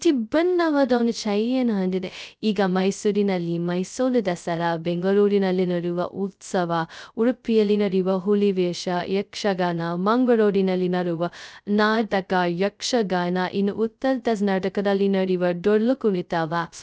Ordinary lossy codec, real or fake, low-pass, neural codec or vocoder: none; fake; none; codec, 16 kHz, 0.2 kbps, FocalCodec